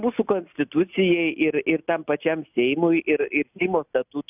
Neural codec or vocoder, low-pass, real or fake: none; 3.6 kHz; real